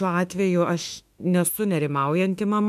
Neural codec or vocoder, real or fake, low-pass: autoencoder, 48 kHz, 32 numbers a frame, DAC-VAE, trained on Japanese speech; fake; 14.4 kHz